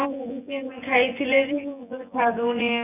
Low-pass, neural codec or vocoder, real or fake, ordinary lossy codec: 3.6 kHz; vocoder, 24 kHz, 100 mel bands, Vocos; fake; none